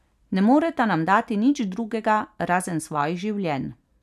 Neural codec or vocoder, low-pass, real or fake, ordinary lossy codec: none; 14.4 kHz; real; none